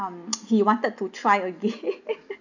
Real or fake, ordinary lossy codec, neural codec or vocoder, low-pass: real; none; none; 7.2 kHz